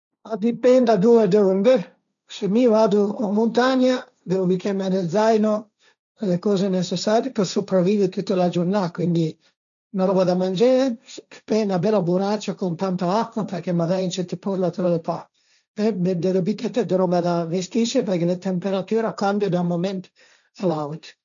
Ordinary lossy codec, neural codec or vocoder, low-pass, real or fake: none; codec, 16 kHz, 1.1 kbps, Voila-Tokenizer; 7.2 kHz; fake